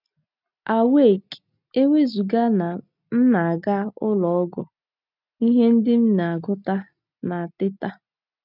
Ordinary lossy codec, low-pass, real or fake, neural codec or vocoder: none; 5.4 kHz; real; none